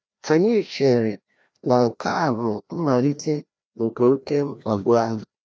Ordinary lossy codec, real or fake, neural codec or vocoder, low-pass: none; fake; codec, 16 kHz, 1 kbps, FreqCodec, larger model; none